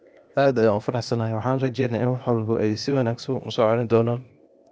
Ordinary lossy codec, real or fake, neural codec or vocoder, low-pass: none; fake; codec, 16 kHz, 0.8 kbps, ZipCodec; none